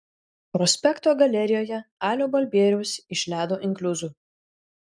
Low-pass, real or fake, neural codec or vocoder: 9.9 kHz; real; none